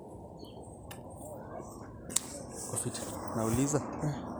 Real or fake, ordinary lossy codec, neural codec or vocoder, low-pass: real; none; none; none